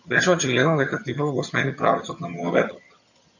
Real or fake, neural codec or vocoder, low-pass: fake; vocoder, 22.05 kHz, 80 mel bands, HiFi-GAN; 7.2 kHz